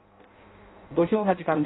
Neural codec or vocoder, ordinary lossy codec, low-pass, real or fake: codec, 16 kHz in and 24 kHz out, 0.6 kbps, FireRedTTS-2 codec; AAC, 16 kbps; 7.2 kHz; fake